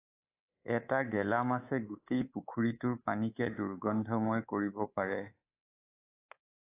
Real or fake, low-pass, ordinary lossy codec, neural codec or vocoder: fake; 3.6 kHz; AAC, 24 kbps; codec, 24 kHz, 3.1 kbps, DualCodec